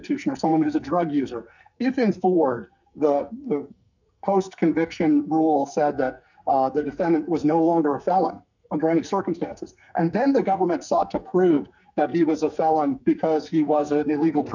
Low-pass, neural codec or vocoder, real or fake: 7.2 kHz; codec, 44.1 kHz, 2.6 kbps, SNAC; fake